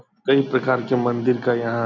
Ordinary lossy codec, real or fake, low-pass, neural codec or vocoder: none; real; 7.2 kHz; none